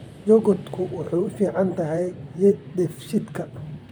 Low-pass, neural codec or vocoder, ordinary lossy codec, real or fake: none; vocoder, 44.1 kHz, 128 mel bands, Pupu-Vocoder; none; fake